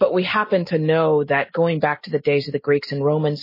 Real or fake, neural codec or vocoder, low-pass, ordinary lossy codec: real; none; 5.4 kHz; MP3, 24 kbps